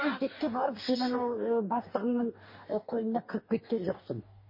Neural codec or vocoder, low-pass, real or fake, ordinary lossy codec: codec, 44.1 kHz, 2.6 kbps, DAC; 5.4 kHz; fake; MP3, 24 kbps